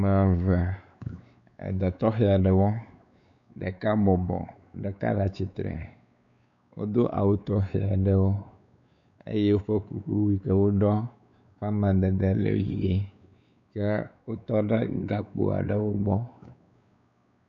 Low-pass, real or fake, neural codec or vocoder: 7.2 kHz; fake; codec, 16 kHz, 4 kbps, X-Codec, WavLM features, trained on Multilingual LibriSpeech